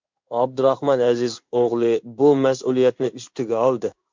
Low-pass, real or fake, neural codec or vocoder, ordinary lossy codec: 7.2 kHz; fake; codec, 16 kHz in and 24 kHz out, 1 kbps, XY-Tokenizer; MP3, 64 kbps